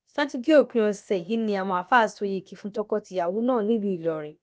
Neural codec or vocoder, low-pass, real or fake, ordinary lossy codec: codec, 16 kHz, about 1 kbps, DyCAST, with the encoder's durations; none; fake; none